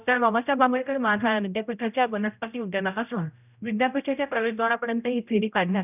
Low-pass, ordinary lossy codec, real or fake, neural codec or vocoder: 3.6 kHz; none; fake; codec, 16 kHz, 0.5 kbps, X-Codec, HuBERT features, trained on general audio